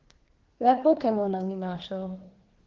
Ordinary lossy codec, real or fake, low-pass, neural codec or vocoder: Opus, 16 kbps; fake; 7.2 kHz; codec, 24 kHz, 1 kbps, SNAC